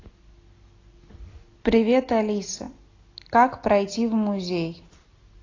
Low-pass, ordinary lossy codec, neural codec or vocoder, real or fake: 7.2 kHz; AAC, 32 kbps; none; real